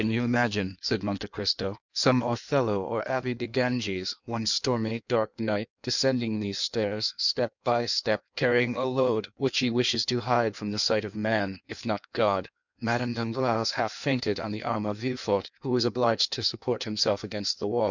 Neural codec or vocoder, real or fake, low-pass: codec, 16 kHz in and 24 kHz out, 1.1 kbps, FireRedTTS-2 codec; fake; 7.2 kHz